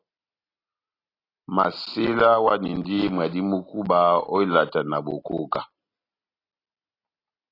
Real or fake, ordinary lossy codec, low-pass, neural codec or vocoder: real; AAC, 24 kbps; 5.4 kHz; none